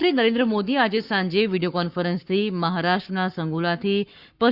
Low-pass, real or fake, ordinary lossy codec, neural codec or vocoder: 5.4 kHz; fake; Opus, 64 kbps; codec, 44.1 kHz, 7.8 kbps, Pupu-Codec